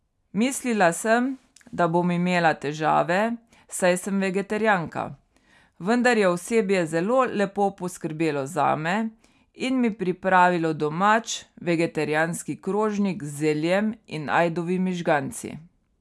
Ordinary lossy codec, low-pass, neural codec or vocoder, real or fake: none; none; none; real